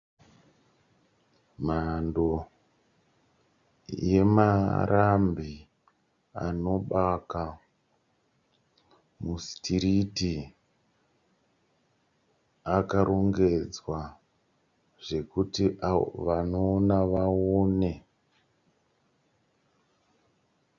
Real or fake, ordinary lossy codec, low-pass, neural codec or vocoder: real; Opus, 64 kbps; 7.2 kHz; none